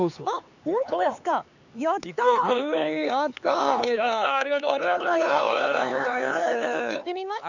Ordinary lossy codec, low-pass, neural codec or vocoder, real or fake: none; 7.2 kHz; codec, 16 kHz, 4 kbps, X-Codec, HuBERT features, trained on LibriSpeech; fake